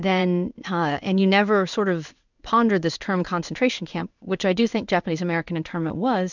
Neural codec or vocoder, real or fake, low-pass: codec, 16 kHz in and 24 kHz out, 1 kbps, XY-Tokenizer; fake; 7.2 kHz